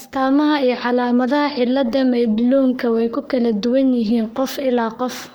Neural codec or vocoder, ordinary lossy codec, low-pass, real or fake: codec, 44.1 kHz, 3.4 kbps, Pupu-Codec; none; none; fake